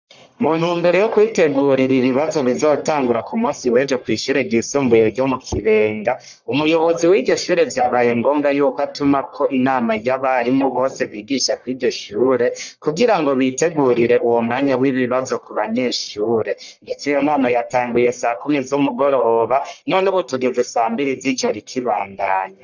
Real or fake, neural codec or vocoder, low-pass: fake; codec, 44.1 kHz, 1.7 kbps, Pupu-Codec; 7.2 kHz